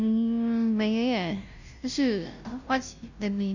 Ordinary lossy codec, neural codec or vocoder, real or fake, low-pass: none; codec, 16 kHz, 0.5 kbps, FunCodec, trained on Chinese and English, 25 frames a second; fake; 7.2 kHz